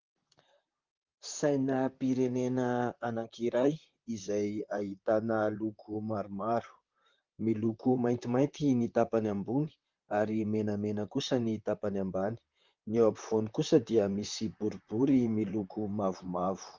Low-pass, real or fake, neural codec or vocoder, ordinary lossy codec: 7.2 kHz; fake; vocoder, 44.1 kHz, 128 mel bands, Pupu-Vocoder; Opus, 32 kbps